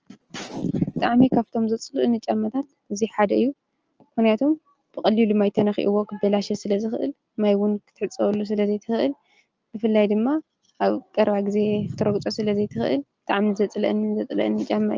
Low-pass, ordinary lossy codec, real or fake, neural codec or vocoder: 7.2 kHz; Opus, 24 kbps; real; none